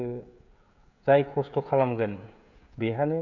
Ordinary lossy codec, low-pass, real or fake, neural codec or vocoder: none; 7.2 kHz; fake; codec, 16 kHz, 16 kbps, FreqCodec, smaller model